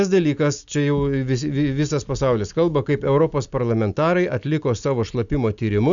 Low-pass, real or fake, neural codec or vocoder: 7.2 kHz; real; none